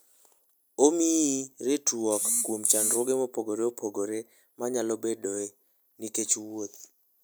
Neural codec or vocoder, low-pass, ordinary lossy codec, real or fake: none; none; none; real